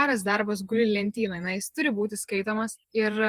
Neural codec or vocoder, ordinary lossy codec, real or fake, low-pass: vocoder, 48 kHz, 128 mel bands, Vocos; Opus, 24 kbps; fake; 14.4 kHz